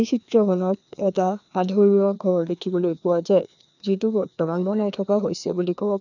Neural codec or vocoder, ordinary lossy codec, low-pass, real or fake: codec, 16 kHz, 2 kbps, FreqCodec, larger model; none; 7.2 kHz; fake